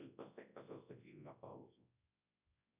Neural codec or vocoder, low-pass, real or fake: codec, 24 kHz, 0.9 kbps, WavTokenizer, large speech release; 3.6 kHz; fake